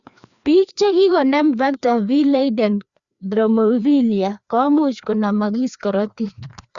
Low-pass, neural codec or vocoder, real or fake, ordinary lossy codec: 7.2 kHz; codec, 16 kHz, 2 kbps, FreqCodec, larger model; fake; Opus, 64 kbps